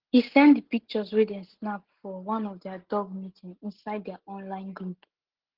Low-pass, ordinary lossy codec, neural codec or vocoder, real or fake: 5.4 kHz; Opus, 16 kbps; none; real